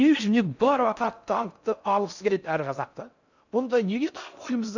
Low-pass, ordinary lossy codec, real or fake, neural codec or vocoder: 7.2 kHz; none; fake; codec, 16 kHz in and 24 kHz out, 0.6 kbps, FocalCodec, streaming, 4096 codes